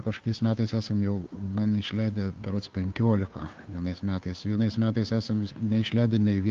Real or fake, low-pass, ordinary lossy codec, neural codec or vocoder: fake; 7.2 kHz; Opus, 32 kbps; codec, 16 kHz, 2 kbps, FunCodec, trained on Chinese and English, 25 frames a second